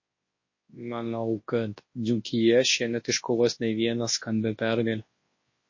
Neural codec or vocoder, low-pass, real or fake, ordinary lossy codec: codec, 24 kHz, 0.9 kbps, WavTokenizer, large speech release; 7.2 kHz; fake; MP3, 32 kbps